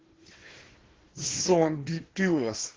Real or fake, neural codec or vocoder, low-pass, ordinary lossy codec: fake; codec, 16 kHz, 1 kbps, FunCodec, trained on Chinese and English, 50 frames a second; 7.2 kHz; Opus, 16 kbps